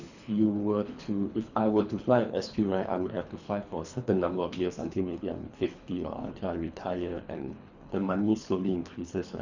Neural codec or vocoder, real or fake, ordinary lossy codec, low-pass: codec, 24 kHz, 3 kbps, HILCodec; fake; none; 7.2 kHz